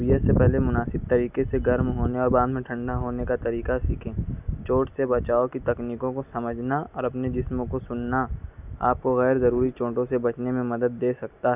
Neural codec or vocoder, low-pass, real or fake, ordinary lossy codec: none; 3.6 kHz; real; none